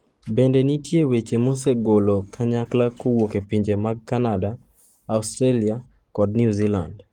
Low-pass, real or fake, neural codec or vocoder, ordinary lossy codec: 19.8 kHz; fake; codec, 44.1 kHz, 7.8 kbps, Pupu-Codec; Opus, 24 kbps